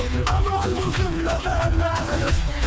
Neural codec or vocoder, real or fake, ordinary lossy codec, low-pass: codec, 16 kHz, 2 kbps, FreqCodec, smaller model; fake; none; none